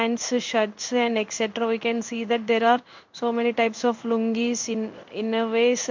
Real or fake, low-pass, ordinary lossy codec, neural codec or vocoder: fake; 7.2 kHz; MP3, 48 kbps; codec, 16 kHz in and 24 kHz out, 1 kbps, XY-Tokenizer